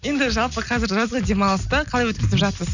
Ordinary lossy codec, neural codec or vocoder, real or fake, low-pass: none; none; real; 7.2 kHz